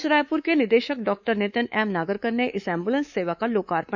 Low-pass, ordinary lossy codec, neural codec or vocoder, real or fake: 7.2 kHz; none; codec, 24 kHz, 3.1 kbps, DualCodec; fake